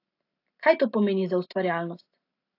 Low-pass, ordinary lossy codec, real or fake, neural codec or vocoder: 5.4 kHz; AAC, 32 kbps; real; none